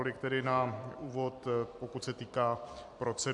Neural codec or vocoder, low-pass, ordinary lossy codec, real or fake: vocoder, 44.1 kHz, 128 mel bands every 256 samples, BigVGAN v2; 10.8 kHz; AAC, 64 kbps; fake